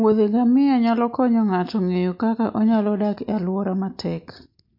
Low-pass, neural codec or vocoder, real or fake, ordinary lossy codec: 5.4 kHz; none; real; MP3, 32 kbps